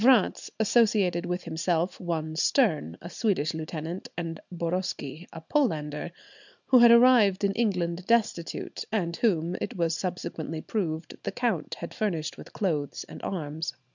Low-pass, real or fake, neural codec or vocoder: 7.2 kHz; real; none